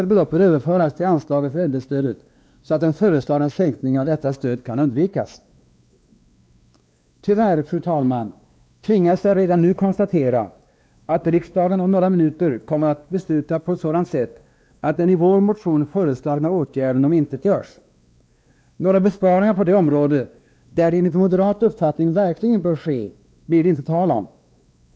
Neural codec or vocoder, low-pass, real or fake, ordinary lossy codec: codec, 16 kHz, 2 kbps, X-Codec, WavLM features, trained on Multilingual LibriSpeech; none; fake; none